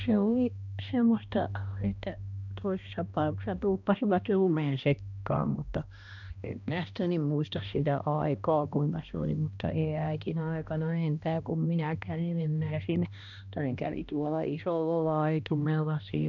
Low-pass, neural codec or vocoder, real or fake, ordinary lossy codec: 7.2 kHz; codec, 16 kHz, 1 kbps, X-Codec, HuBERT features, trained on balanced general audio; fake; none